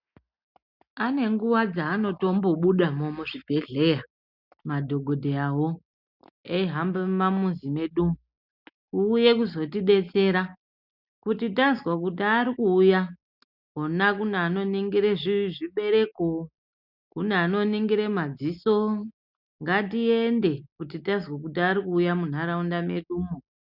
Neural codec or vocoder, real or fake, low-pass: none; real; 5.4 kHz